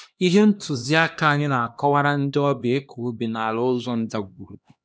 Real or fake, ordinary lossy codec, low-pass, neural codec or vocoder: fake; none; none; codec, 16 kHz, 1 kbps, X-Codec, HuBERT features, trained on LibriSpeech